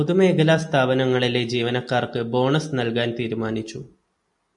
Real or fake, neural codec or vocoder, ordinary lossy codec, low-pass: real; none; MP3, 64 kbps; 10.8 kHz